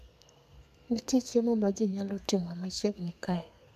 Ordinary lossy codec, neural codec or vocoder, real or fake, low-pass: none; codec, 32 kHz, 1.9 kbps, SNAC; fake; 14.4 kHz